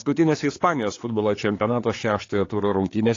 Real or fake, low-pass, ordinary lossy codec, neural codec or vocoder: fake; 7.2 kHz; AAC, 32 kbps; codec, 16 kHz, 4 kbps, X-Codec, HuBERT features, trained on general audio